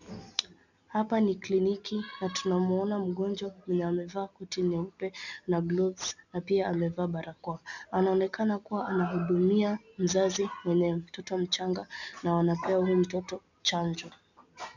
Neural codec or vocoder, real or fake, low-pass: none; real; 7.2 kHz